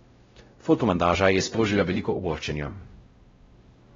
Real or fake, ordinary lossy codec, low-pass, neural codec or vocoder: fake; AAC, 24 kbps; 7.2 kHz; codec, 16 kHz, 0.5 kbps, X-Codec, WavLM features, trained on Multilingual LibriSpeech